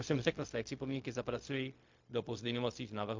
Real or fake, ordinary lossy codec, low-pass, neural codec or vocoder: fake; MP3, 48 kbps; 7.2 kHz; codec, 16 kHz, 0.4 kbps, LongCat-Audio-Codec